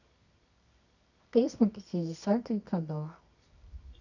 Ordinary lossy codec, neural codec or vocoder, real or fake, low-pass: none; codec, 24 kHz, 0.9 kbps, WavTokenizer, medium music audio release; fake; 7.2 kHz